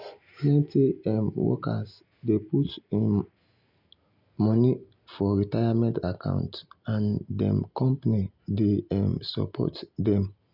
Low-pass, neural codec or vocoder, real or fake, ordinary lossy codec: 5.4 kHz; none; real; none